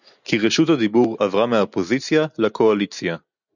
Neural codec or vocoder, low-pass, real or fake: none; 7.2 kHz; real